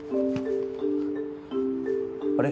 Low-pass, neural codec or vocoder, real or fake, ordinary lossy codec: none; none; real; none